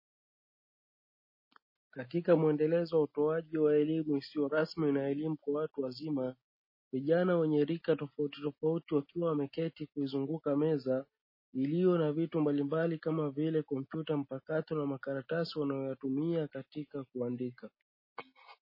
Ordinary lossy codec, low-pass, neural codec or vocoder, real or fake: MP3, 24 kbps; 5.4 kHz; none; real